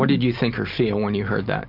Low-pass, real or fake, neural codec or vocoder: 5.4 kHz; real; none